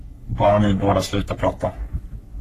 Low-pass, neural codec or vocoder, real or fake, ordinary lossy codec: 14.4 kHz; codec, 44.1 kHz, 3.4 kbps, Pupu-Codec; fake; AAC, 48 kbps